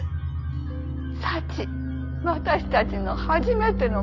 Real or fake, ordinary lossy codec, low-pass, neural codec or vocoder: real; none; 7.2 kHz; none